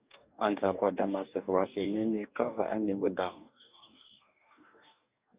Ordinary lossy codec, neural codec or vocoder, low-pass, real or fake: Opus, 64 kbps; codec, 44.1 kHz, 2.6 kbps, DAC; 3.6 kHz; fake